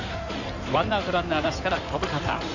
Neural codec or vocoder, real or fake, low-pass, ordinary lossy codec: codec, 16 kHz in and 24 kHz out, 2.2 kbps, FireRedTTS-2 codec; fake; 7.2 kHz; none